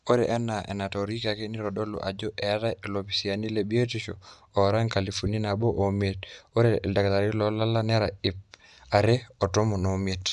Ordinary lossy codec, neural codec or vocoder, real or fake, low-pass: none; none; real; 10.8 kHz